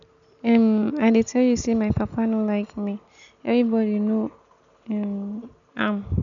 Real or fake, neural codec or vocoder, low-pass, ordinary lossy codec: real; none; 7.2 kHz; none